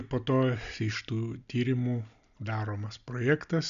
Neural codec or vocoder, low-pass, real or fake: none; 7.2 kHz; real